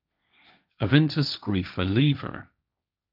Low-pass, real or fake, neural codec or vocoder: 5.4 kHz; fake; codec, 16 kHz, 1.1 kbps, Voila-Tokenizer